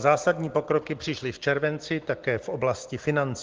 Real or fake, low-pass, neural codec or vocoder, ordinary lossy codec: real; 7.2 kHz; none; Opus, 16 kbps